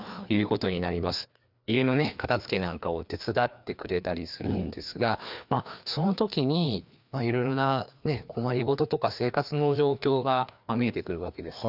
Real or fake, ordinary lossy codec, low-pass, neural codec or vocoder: fake; none; 5.4 kHz; codec, 16 kHz, 2 kbps, FreqCodec, larger model